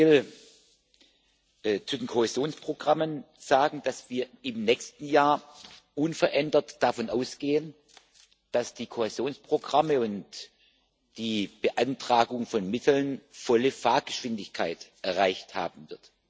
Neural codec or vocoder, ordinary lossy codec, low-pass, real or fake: none; none; none; real